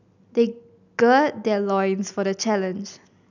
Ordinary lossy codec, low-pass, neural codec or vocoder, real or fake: none; 7.2 kHz; none; real